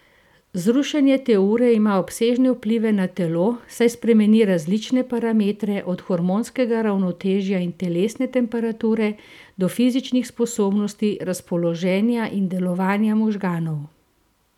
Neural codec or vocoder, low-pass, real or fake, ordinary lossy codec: none; 19.8 kHz; real; none